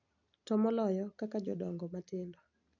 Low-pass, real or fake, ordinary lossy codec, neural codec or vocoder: 7.2 kHz; real; none; none